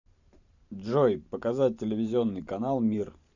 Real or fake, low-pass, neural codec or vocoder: fake; 7.2 kHz; vocoder, 44.1 kHz, 128 mel bands every 512 samples, BigVGAN v2